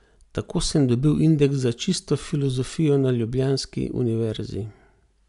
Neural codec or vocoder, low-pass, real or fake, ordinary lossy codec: none; 10.8 kHz; real; none